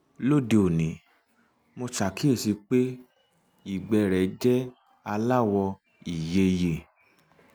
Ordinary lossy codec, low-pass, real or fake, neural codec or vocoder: none; none; real; none